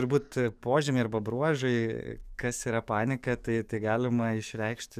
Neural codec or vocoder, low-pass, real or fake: codec, 44.1 kHz, 7.8 kbps, DAC; 14.4 kHz; fake